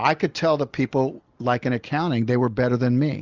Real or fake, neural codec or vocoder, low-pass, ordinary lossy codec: real; none; 7.2 kHz; Opus, 32 kbps